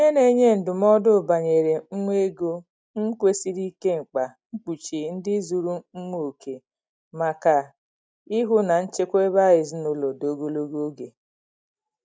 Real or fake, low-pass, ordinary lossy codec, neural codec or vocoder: real; none; none; none